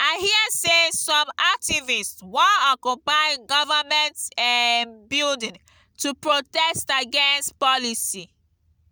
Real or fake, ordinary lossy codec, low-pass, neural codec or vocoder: real; none; none; none